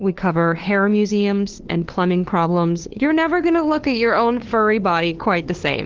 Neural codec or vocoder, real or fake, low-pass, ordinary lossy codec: codec, 16 kHz, 2 kbps, FunCodec, trained on LibriTTS, 25 frames a second; fake; 7.2 kHz; Opus, 16 kbps